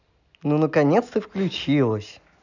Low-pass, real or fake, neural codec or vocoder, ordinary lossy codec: 7.2 kHz; real; none; none